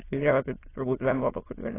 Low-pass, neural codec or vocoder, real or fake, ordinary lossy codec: 3.6 kHz; autoencoder, 22.05 kHz, a latent of 192 numbers a frame, VITS, trained on many speakers; fake; AAC, 16 kbps